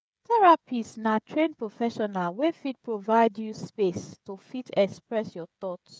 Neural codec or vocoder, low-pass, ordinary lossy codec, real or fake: codec, 16 kHz, 16 kbps, FreqCodec, smaller model; none; none; fake